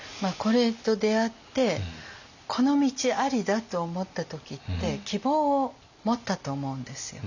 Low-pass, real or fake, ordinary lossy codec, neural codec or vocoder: 7.2 kHz; real; none; none